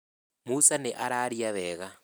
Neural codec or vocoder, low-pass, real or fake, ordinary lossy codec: none; none; real; none